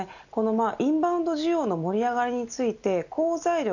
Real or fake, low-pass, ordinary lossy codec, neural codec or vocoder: real; 7.2 kHz; none; none